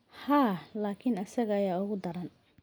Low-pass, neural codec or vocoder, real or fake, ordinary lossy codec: none; none; real; none